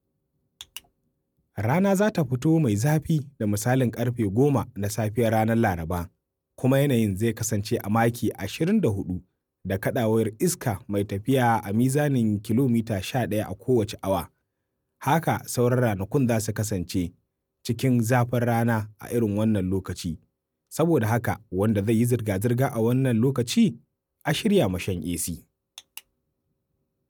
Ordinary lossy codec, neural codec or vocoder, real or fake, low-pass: none; none; real; none